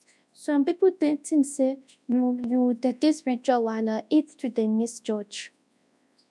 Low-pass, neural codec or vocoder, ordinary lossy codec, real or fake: none; codec, 24 kHz, 0.9 kbps, WavTokenizer, large speech release; none; fake